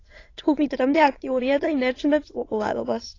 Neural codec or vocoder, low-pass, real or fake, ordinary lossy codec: autoencoder, 22.05 kHz, a latent of 192 numbers a frame, VITS, trained on many speakers; 7.2 kHz; fake; AAC, 32 kbps